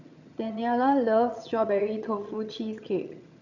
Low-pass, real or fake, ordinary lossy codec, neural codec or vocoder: 7.2 kHz; fake; none; vocoder, 22.05 kHz, 80 mel bands, HiFi-GAN